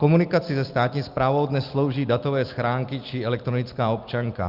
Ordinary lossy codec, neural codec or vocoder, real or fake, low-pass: Opus, 24 kbps; none; real; 5.4 kHz